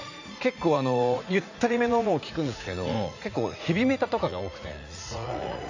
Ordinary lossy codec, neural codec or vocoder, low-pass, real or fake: AAC, 48 kbps; vocoder, 44.1 kHz, 80 mel bands, Vocos; 7.2 kHz; fake